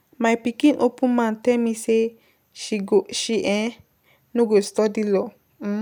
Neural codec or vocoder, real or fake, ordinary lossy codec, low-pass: none; real; none; 19.8 kHz